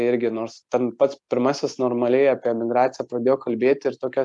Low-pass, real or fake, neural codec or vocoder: 10.8 kHz; real; none